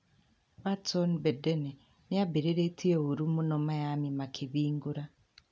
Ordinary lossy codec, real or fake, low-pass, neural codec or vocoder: none; real; none; none